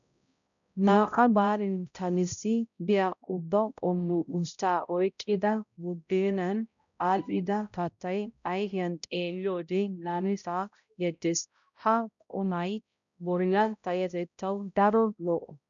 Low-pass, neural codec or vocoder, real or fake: 7.2 kHz; codec, 16 kHz, 0.5 kbps, X-Codec, HuBERT features, trained on balanced general audio; fake